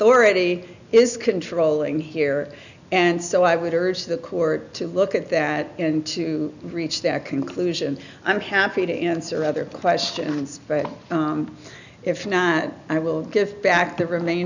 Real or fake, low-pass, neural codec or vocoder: real; 7.2 kHz; none